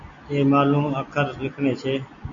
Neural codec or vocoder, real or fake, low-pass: none; real; 7.2 kHz